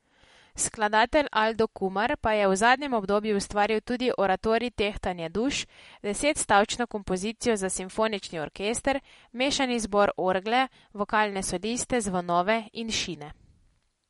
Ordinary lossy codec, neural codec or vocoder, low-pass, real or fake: MP3, 48 kbps; none; 19.8 kHz; real